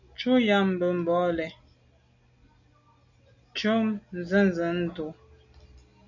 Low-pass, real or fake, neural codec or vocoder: 7.2 kHz; real; none